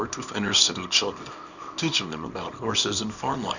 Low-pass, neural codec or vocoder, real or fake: 7.2 kHz; codec, 24 kHz, 0.9 kbps, WavTokenizer, small release; fake